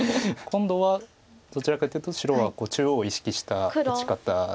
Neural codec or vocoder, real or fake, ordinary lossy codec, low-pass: none; real; none; none